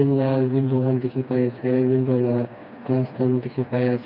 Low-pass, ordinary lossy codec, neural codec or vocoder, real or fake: 5.4 kHz; none; codec, 16 kHz, 2 kbps, FreqCodec, smaller model; fake